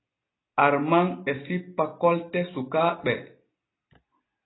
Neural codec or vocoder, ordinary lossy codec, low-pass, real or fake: none; AAC, 16 kbps; 7.2 kHz; real